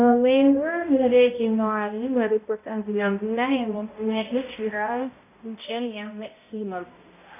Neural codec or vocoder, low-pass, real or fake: codec, 16 kHz, 0.5 kbps, X-Codec, HuBERT features, trained on balanced general audio; 3.6 kHz; fake